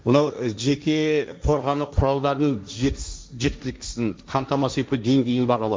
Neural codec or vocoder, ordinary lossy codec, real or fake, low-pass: codec, 16 kHz, 1.1 kbps, Voila-Tokenizer; none; fake; none